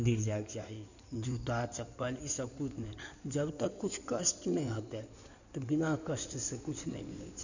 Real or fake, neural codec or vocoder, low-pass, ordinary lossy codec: fake; codec, 16 kHz in and 24 kHz out, 2.2 kbps, FireRedTTS-2 codec; 7.2 kHz; none